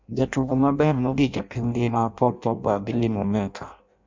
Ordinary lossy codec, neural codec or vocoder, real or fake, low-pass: MP3, 64 kbps; codec, 16 kHz in and 24 kHz out, 0.6 kbps, FireRedTTS-2 codec; fake; 7.2 kHz